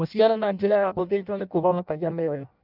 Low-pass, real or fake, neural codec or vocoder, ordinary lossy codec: 5.4 kHz; fake; codec, 16 kHz in and 24 kHz out, 0.6 kbps, FireRedTTS-2 codec; none